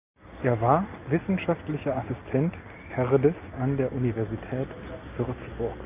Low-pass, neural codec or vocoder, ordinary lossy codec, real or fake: 3.6 kHz; none; AAC, 32 kbps; real